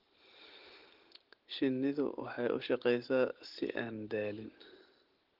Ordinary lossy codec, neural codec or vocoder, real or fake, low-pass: Opus, 16 kbps; none; real; 5.4 kHz